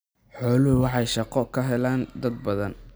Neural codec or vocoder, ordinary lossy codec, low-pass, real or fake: none; none; none; real